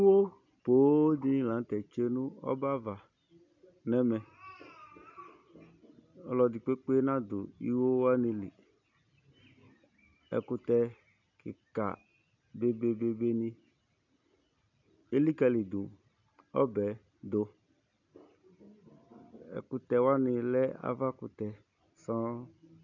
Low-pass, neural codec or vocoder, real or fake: 7.2 kHz; none; real